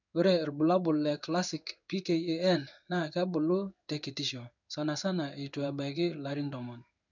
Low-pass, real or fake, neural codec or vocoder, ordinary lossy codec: 7.2 kHz; fake; codec, 16 kHz in and 24 kHz out, 1 kbps, XY-Tokenizer; none